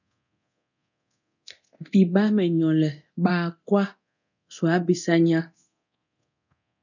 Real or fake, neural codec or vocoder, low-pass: fake; codec, 24 kHz, 0.9 kbps, DualCodec; 7.2 kHz